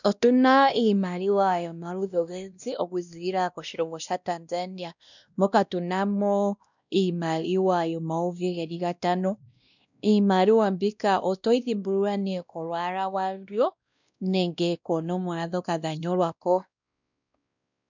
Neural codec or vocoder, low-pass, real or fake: codec, 16 kHz, 1 kbps, X-Codec, WavLM features, trained on Multilingual LibriSpeech; 7.2 kHz; fake